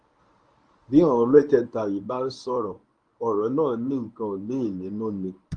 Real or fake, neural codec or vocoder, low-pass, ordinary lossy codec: fake; codec, 24 kHz, 0.9 kbps, WavTokenizer, medium speech release version 1; 9.9 kHz; Opus, 32 kbps